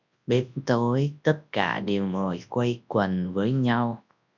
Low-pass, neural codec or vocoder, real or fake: 7.2 kHz; codec, 24 kHz, 0.9 kbps, WavTokenizer, large speech release; fake